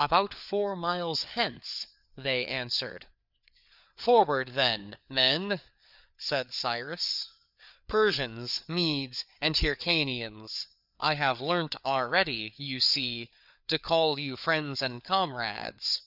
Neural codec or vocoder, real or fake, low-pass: codec, 16 kHz, 4 kbps, FreqCodec, larger model; fake; 5.4 kHz